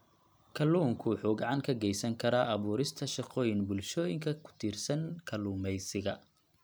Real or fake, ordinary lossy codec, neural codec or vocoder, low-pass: real; none; none; none